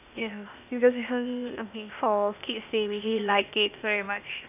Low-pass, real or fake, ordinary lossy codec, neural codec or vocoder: 3.6 kHz; fake; none; codec, 16 kHz, 0.8 kbps, ZipCodec